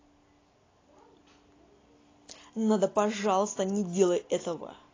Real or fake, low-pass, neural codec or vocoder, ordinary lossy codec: real; 7.2 kHz; none; AAC, 32 kbps